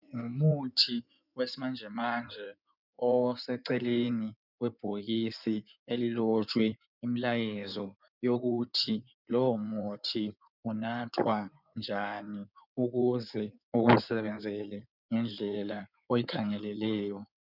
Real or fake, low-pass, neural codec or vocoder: fake; 5.4 kHz; codec, 16 kHz in and 24 kHz out, 2.2 kbps, FireRedTTS-2 codec